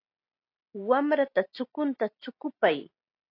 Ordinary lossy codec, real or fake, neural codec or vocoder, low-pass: AAC, 32 kbps; real; none; 5.4 kHz